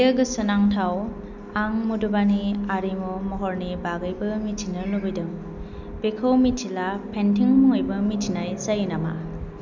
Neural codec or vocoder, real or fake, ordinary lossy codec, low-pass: none; real; none; 7.2 kHz